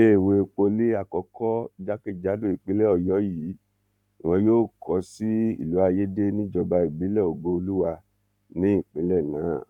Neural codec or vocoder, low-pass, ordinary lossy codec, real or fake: codec, 44.1 kHz, 7.8 kbps, Pupu-Codec; 19.8 kHz; none; fake